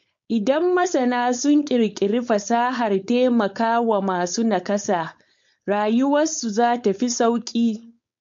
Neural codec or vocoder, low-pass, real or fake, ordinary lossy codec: codec, 16 kHz, 4.8 kbps, FACodec; 7.2 kHz; fake; MP3, 48 kbps